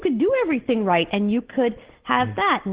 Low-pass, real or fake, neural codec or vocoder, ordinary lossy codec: 3.6 kHz; real; none; Opus, 16 kbps